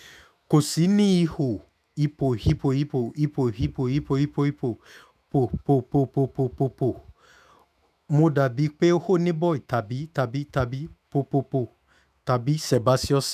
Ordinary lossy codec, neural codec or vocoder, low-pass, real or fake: none; autoencoder, 48 kHz, 128 numbers a frame, DAC-VAE, trained on Japanese speech; 14.4 kHz; fake